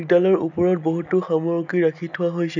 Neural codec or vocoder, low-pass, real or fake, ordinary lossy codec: none; 7.2 kHz; real; none